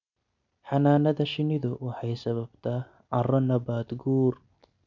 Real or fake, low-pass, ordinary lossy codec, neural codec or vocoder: real; 7.2 kHz; none; none